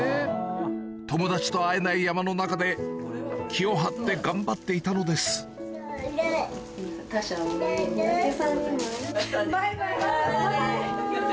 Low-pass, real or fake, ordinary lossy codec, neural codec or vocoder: none; real; none; none